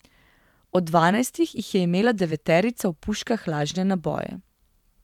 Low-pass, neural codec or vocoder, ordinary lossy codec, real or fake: 19.8 kHz; vocoder, 44.1 kHz, 128 mel bands every 512 samples, BigVGAN v2; none; fake